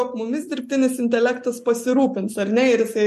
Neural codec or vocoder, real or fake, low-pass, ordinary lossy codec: none; real; 14.4 kHz; MP3, 64 kbps